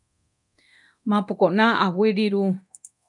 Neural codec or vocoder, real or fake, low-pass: codec, 24 kHz, 0.9 kbps, DualCodec; fake; 10.8 kHz